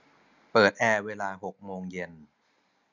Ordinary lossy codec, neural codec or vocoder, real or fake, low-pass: none; none; real; 7.2 kHz